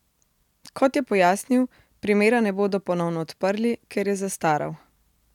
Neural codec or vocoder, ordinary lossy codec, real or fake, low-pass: none; none; real; 19.8 kHz